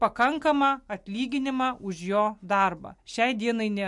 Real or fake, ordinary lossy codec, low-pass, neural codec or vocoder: real; MP3, 64 kbps; 10.8 kHz; none